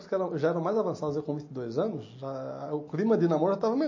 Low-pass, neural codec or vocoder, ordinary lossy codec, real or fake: 7.2 kHz; none; MP3, 32 kbps; real